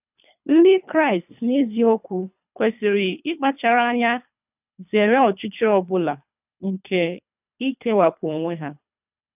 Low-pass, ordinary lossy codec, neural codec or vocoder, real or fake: 3.6 kHz; none; codec, 24 kHz, 3 kbps, HILCodec; fake